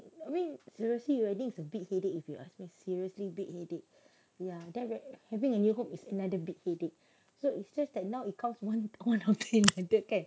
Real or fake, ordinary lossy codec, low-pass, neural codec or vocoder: real; none; none; none